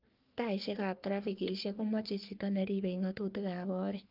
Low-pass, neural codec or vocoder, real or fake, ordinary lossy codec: 5.4 kHz; codec, 44.1 kHz, 3.4 kbps, Pupu-Codec; fake; Opus, 24 kbps